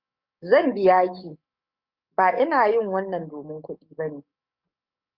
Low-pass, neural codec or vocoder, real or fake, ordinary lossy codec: 5.4 kHz; codec, 44.1 kHz, 7.8 kbps, DAC; fake; Opus, 64 kbps